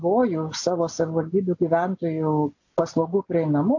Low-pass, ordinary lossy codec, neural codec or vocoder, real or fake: 7.2 kHz; AAC, 48 kbps; none; real